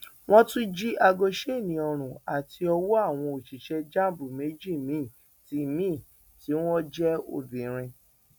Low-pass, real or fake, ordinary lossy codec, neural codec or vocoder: 19.8 kHz; real; none; none